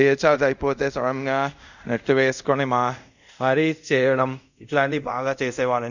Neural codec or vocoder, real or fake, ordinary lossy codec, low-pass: codec, 24 kHz, 0.5 kbps, DualCodec; fake; none; 7.2 kHz